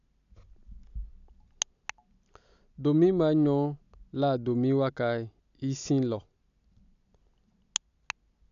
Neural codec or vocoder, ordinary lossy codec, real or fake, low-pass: none; none; real; 7.2 kHz